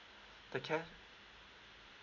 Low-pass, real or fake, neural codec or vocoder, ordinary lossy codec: 7.2 kHz; real; none; MP3, 64 kbps